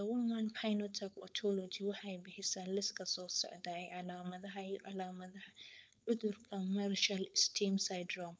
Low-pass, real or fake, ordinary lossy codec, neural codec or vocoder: none; fake; none; codec, 16 kHz, 4.8 kbps, FACodec